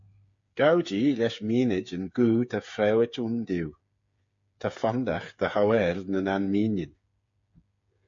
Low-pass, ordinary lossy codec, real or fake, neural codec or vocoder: 7.2 kHz; MP3, 48 kbps; fake; codec, 16 kHz, 8 kbps, FreqCodec, smaller model